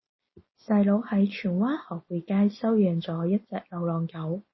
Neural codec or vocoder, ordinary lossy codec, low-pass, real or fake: none; MP3, 24 kbps; 7.2 kHz; real